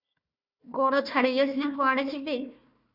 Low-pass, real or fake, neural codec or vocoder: 5.4 kHz; fake; codec, 16 kHz, 1 kbps, FunCodec, trained on Chinese and English, 50 frames a second